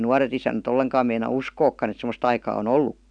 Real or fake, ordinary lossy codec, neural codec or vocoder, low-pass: real; none; none; 9.9 kHz